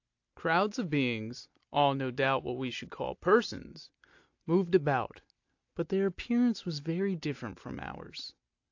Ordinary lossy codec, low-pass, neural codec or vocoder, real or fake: AAC, 48 kbps; 7.2 kHz; none; real